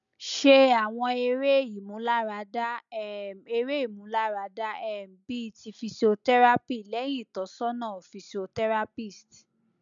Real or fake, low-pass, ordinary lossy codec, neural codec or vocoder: real; 7.2 kHz; none; none